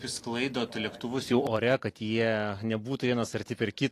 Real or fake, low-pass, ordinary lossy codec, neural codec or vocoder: fake; 14.4 kHz; AAC, 48 kbps; vocoder, 44.1 kHz, 128 mel bands every 256 samples, BigVGAN v2